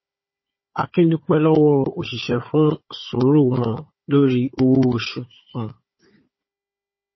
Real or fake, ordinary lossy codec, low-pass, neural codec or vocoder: fake; MP3, 24 kbps; 7.2 kHz; codec, 16 kHz, 4 kbps, FunCodec, trained on Chinese and English, 50 frames a second